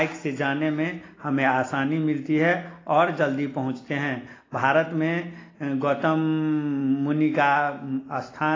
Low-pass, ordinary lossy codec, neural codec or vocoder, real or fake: 7.2 kHz; AAC, 32 kbps; none; real